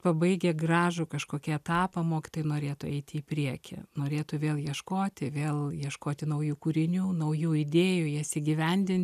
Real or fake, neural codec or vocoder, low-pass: real; none; 14.4 kHz